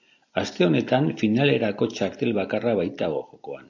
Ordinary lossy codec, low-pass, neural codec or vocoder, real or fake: AAC, 48 kbps; 7.2 kHz; none; real